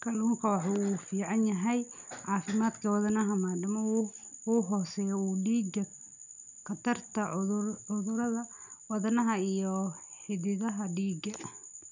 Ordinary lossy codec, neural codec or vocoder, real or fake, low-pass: none; none; real; 7.2 kHz